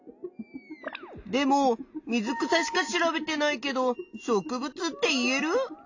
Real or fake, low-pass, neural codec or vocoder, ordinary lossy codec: real; 7.2 kHz; none; AAC, 48 kbps